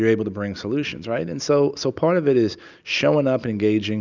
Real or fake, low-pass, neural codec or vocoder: real; 7.2 kHz; none